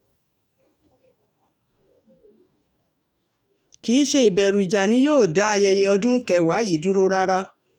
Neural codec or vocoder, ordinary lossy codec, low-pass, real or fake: codec, 44.1 kHz, 2.6 kbps, DAC; none; 19.8 kHz; fake